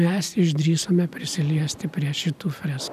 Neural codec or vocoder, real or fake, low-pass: none; real; 14.4 kHz